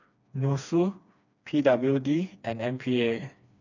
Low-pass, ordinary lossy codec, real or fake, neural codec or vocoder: 7.2 kHz; none; fake; codec, 16 kHz, 2 kbps, FreqCodec, smaller model